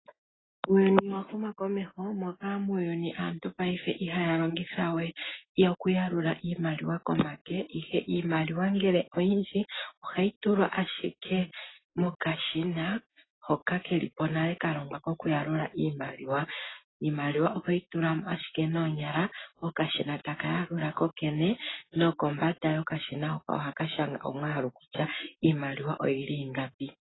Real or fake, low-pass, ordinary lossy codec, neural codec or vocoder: real; 7.2 kHz; AAC, 16 kbps; none